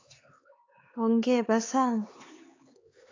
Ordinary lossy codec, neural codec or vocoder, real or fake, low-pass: AAC, 32 kbps; codec, 16 kHz, 4 kbps, X-Codec, HuBERT features, trained on LibriSpeech; fake; 7.2 kHz